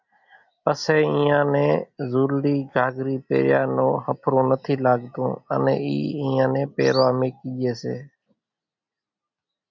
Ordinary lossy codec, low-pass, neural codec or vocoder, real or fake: MP3, 64 kbps; 7.2 kHz; none; real